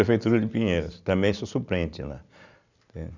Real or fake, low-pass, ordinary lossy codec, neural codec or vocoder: real; 7.2 kHz; none; none